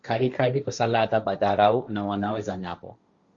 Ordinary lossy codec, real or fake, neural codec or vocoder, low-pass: Opus, 64 kbps; fake; codec, 16 kHz, 1.1 kbps, Voila-Tokenizer; 7.2 kHz